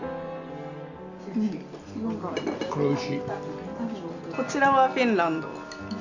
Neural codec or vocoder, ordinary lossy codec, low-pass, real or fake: none; none; 7.2 kHz; real